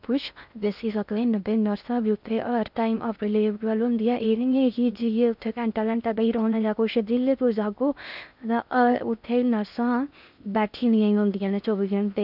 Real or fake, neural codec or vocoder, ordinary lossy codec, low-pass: fake; codec, 16 kHz in and 24 kHz out, 0.6 kbps, FocalCodec, streaming, 2048 codes; none; 5.4 kHz